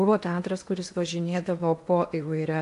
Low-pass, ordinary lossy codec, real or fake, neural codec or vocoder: 10.8 kHz; AAC, 64 kbps; fake; codec, 16 kHz in and 24 kHz out, 0.8 kbps, FocalCodec, streaming, 65536 codes